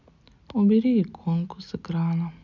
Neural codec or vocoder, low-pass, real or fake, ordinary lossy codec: none; 7.2 kHz; real; none